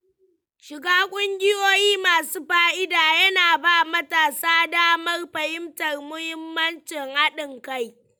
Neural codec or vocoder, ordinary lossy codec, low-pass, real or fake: none; none; none; real